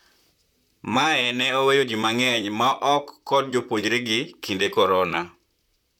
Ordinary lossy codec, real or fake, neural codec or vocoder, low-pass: none; fake; vocoder, 44.1 kHz, 128 mel bands, Pupu-Vocoder; 19.8 kHz